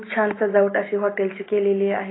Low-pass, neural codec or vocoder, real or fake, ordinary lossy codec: 7.2 kHz; none; real; AAC, 16 kbps